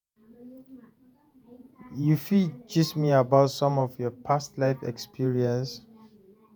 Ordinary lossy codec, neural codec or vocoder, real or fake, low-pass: none; vocoder, 48 kHz, 128 mel bands, Vocos; fake; none